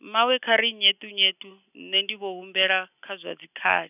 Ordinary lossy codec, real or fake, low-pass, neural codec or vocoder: none; real; 3.6 kHz; none